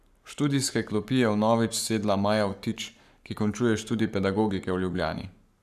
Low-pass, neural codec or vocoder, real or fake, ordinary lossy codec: 14.4 kHz; codec, 44.1 kHz, 7.8 kbps, Pupu-Codec; fake; none